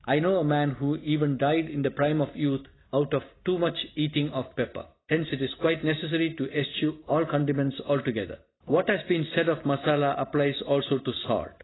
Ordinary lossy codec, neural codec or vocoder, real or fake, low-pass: AAC, 16 kbps; none; real; 7.2 kHz